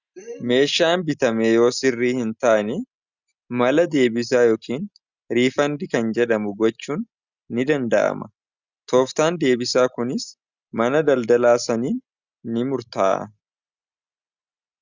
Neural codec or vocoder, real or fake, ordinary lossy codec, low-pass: none; real; Opus, 64 kbps; 7.2 kHz